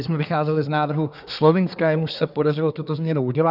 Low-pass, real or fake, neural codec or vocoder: 5.4 kHz; fake; codec, 24 kHz, 1 kbps, SNAC